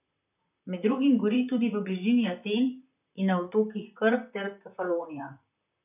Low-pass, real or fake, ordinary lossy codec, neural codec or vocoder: 3.6 kHz; fake; none; vocoder, 44.1 kHz, 128 mel bands, Pupu-Vocoder